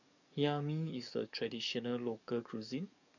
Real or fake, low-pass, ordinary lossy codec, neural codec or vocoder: fake; 7.2 kHz; none; codec, 44.1 kHz, 7.8 kbps, DAC